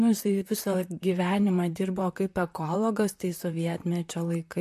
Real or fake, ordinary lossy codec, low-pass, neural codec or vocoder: fake; MP3, 64 kbps; 14.4 kHz; vocoder, 44.1 kHz, 128 mel bands, Pupu-Vocoder